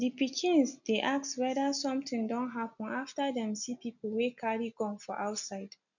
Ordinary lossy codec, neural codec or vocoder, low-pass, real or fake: none; none; 7.2 kHz; real